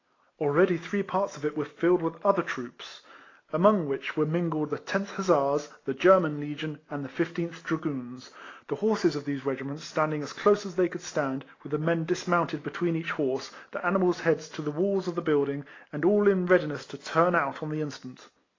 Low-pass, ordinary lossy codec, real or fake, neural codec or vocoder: 7.2 kHz; AAC, 32 kbps; real; none